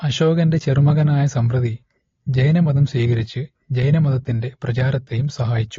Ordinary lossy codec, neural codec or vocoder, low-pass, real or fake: AAC, 32 kbps; none; 7.2 kHz; real